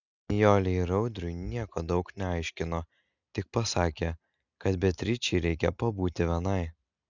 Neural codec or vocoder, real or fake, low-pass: none; real; 7.2 kHz